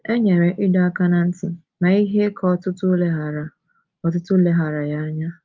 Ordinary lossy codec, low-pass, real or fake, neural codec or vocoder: Opus, 32 kbps; 7.2 kHz; real; none